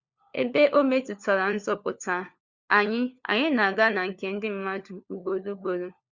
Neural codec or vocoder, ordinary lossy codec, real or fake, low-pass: codec, 16 kHz, 4 kbps, FunCodec, trained on LibriTTS, 50 frames a second; Opus, 64 kbps; fake; 7.2 kHz